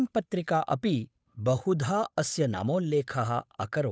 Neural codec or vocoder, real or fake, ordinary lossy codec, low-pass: codec, 16 kHz, 8 kbps, FunCodec, trained on Chinese and English, 25 frames a second; fake; none; none